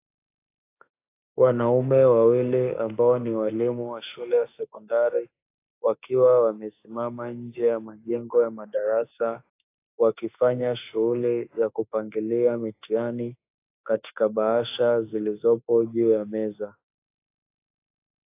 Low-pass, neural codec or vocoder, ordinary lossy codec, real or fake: 3.6 kHz; autoencoder, 48 kHz, 32 numbers a frame, DAC-VAE, trained on Japanese speech; AAC, 24 kbps; fake